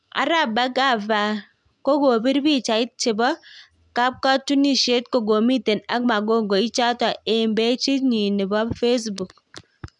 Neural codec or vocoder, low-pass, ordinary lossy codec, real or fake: none; 10.8 kHz; none; real